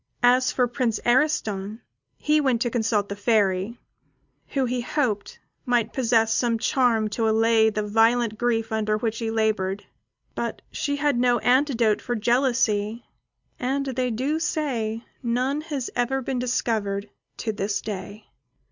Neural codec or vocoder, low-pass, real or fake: none; 7.2 kHz; real